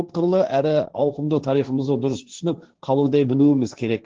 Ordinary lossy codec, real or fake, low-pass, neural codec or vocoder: Opus, 16 kbps; fake; 7.2 kHz; codec, 16 kHz, 1.1 kbps, Voila-Tokenizer